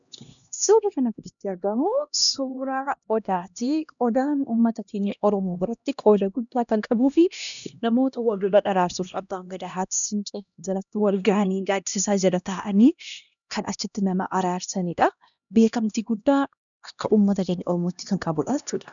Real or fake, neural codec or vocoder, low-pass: fake; codec, 16 kHz, 1 kbps, X-Codec, HuBERT features, trained on LibriSpeech; 7.2 kHz